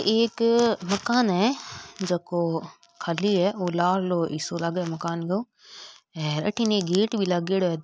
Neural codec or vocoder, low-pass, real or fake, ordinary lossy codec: none; none; real; none